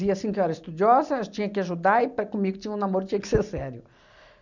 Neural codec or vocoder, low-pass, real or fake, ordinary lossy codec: none; 7.2 kHz; real; none